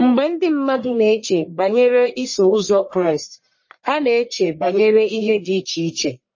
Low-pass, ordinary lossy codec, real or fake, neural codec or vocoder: 7.2 kHz; MP3, 32 kbps; fake; codec, 44.1 kHz, 1.7 kbps, Pupu-Codec